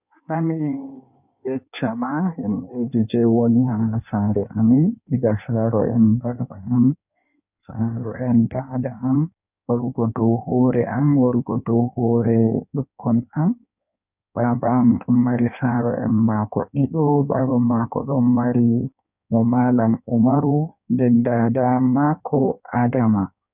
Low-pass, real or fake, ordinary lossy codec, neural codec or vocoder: 3.6 kHz; fake; none; codec, 16 kHz in and 24 kHz out, 1.1 kbps, FireRedTTS-2 codec